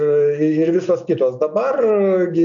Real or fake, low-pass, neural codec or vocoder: real; 7.2 kHz; none